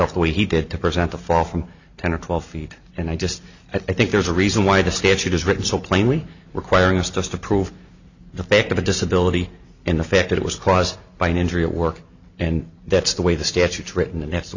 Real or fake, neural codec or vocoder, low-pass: real; none; 7.2 kHz